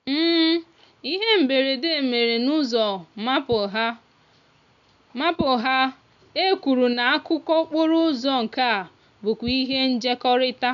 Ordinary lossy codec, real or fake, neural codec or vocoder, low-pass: none; real; none; 7.2 kHz